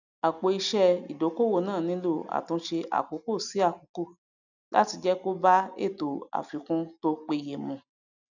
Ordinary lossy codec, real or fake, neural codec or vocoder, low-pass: none; real; none; 7.2 kHz